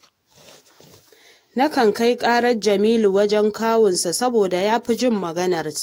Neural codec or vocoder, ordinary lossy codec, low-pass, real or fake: autoencoder, 48 kHz, 128 numbers a frame, DAC-VAE, trained on Japanese speech; AAC, 48 kbps; 19.8 kHz; fake